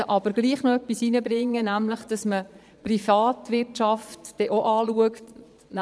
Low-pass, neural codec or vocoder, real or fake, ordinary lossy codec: none; vocoder, 22.05 kHz, 80 mel bands, Vocos; fake; none